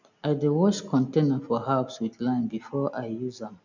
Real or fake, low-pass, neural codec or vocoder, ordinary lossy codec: real; 7.2 kHz; none; none